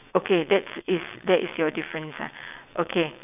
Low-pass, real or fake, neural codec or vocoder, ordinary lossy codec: 3.6 kHz; fake; vocoder, 22.05 kHz, 80 mel bands, WaveNeXt; none